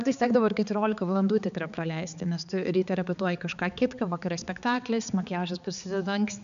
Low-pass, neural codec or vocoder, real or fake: 7.2 kHz; codec, 16 kHz, 4 kbps, X-Codec, HuBERT features, trained on balanced general audio; fake